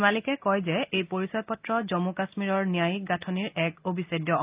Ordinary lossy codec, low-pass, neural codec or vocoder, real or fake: Opus, 24 kbps; 3.6 kHz; none; real